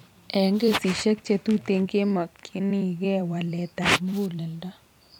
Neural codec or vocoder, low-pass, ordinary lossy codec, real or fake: vocoder, 44.1 kHz, 128 mel bands every 256 samples, BigVGAN v2; 19.8 kHz; none; fake